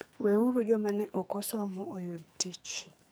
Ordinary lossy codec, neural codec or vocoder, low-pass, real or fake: none; codec, 44.1 kHz, 2.6 kbps, SNAC; none; fake